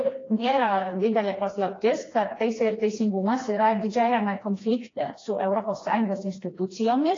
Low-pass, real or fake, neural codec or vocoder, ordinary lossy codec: 7.2 kHz; fake; codec, 16 kHz, 2 kbps, FreqCodec, smaller model; AAC, 32 kbps